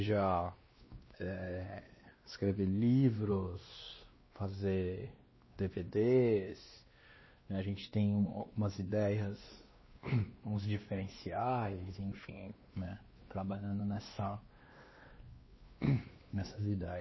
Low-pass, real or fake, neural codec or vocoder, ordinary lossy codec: 7.2 kHz; fake; codec, 16 kHz, 2 kbps, X-Codec, WavLM features, trained on Multilingual LibriSpeech; MP3, 24 kbps